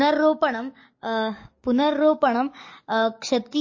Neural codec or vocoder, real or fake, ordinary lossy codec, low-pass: codec, 44.1 kHz, 7.8 kbps, DAC; fake; MP3, 32 kbps; 7.2 kHz